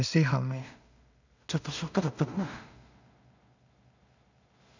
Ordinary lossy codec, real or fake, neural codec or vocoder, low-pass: none; fake; codec, 16 kHz in and 24 kHz out, 0.4 kbps, LongCat-Audio-Codec, two codebook decoder; 7.2 kHz